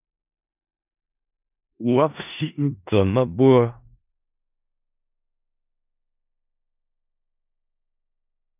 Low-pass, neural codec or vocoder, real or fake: 3.6 kHz; codec, 16 kHz in and 24 kHz out, 0.4 kbps, LongCat-Audio-Codec, four codebook decoder; fake